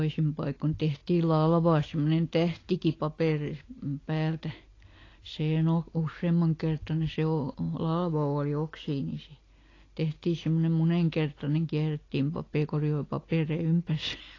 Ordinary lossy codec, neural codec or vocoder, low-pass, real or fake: AAC, 32 kbps; none; 7.2 kHz; real